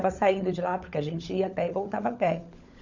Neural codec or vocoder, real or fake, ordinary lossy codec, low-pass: codec, 16 kHz, 16 kbps, FunCodec, trained on LibriTTS, 50 frames a second; fake; none; 7.2 kHz